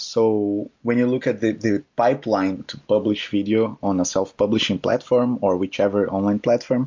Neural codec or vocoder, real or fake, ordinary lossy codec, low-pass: none; real; MP3, 48 kbps; 7.2 kHz